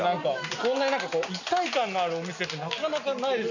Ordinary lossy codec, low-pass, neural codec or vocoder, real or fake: none; 7.2 kHz; none; real